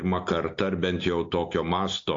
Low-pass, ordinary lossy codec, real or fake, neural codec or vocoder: 7.2 kHz; AAC, 48 kbps; real; none